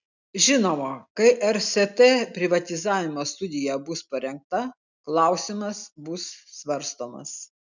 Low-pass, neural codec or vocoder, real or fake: 7.2 kHz; none; real